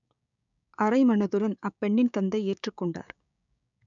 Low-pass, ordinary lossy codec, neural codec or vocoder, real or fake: 7.2 kHz; MP3, 96 kbps; codec, 16 kHz, 6 kbps, DAC; fake